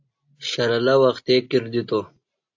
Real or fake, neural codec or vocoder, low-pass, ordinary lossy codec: real; none; 7.2 kHz; AAC, 48 kbps